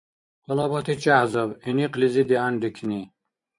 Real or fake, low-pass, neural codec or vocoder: fake; 10.8 kHz; vocoder, 24 kHz, 100 mel bands, Vocos